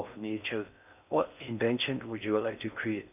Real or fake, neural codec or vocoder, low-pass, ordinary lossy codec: fake; codec, 16 kHz in and 24 kHz out, 0.6 kbps, FocalCodec, streaming, 4096 codes; 3.6 kHz; none